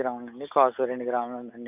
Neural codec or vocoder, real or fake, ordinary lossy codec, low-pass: none; real; none; 3.6 kHz